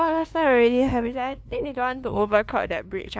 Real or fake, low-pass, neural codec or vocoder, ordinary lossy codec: fake; none; codec, 16 kHz, 2 kbps, FunCodec, trained on LibriTTS, 25 frames a second; none